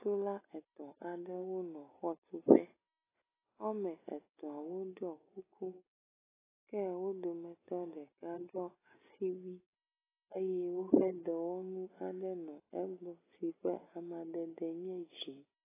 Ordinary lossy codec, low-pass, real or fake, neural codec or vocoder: AAC, 16 kbps; 3.6 kHz; real; none